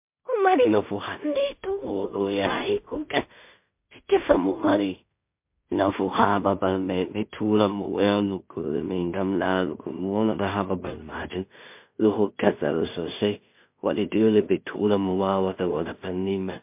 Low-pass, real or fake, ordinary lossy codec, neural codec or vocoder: 3.6 kHz; fake; MP3, 32 kbps; codec, 16 kHz in and 24 kHz out, 0.4 kbps, LongCat-Audio-Codec, two codebook decoder